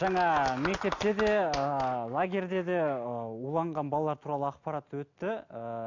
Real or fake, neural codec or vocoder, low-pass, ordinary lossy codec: real; none; 7.2 kHz; AAC, 48 kbps